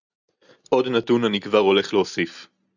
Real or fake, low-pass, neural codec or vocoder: real; 7.2 kHz; none